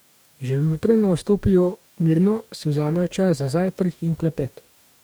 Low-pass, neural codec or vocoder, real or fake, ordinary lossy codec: none; codec, 44.1 kHz, 2.6 kbps, DAC; fake; none